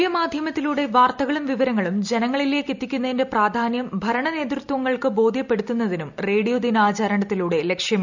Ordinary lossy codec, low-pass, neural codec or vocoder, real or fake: none; 7.2 kHz; none; real